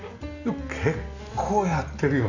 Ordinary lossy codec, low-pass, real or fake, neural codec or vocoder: none; 7.2 kHz; real; none